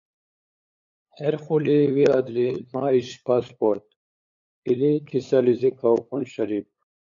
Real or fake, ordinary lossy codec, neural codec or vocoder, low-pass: fake; MP3, 48 kbps; codec, 16 kHz, 8 kbps, FunCodec, trained on LibriTTS, 25 frames a second; 7.2 kHz